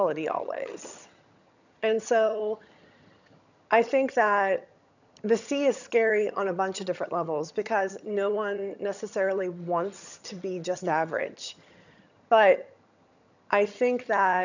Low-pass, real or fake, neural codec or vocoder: 7.2 kHz; fake; vocoder, 22.05 kHz, 80 mel bands, HiFi-GAN